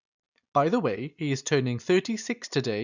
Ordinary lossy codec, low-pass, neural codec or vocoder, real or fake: none; 7.2 kHz; none; real